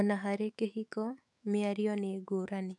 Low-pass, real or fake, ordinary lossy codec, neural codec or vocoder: 10.8 kHz; fake; none; autoencoder, 48 kHz, 128 numbers a frame, DAC-VAE, trained on Japanese speech